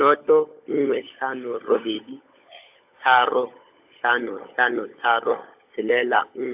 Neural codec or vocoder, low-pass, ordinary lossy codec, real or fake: codec, 16 kHz, 16 kbps, FunCodec, trained on LibriTTS, 50 frames a second; 3.6 kHz; AAC, 32 kbps; fake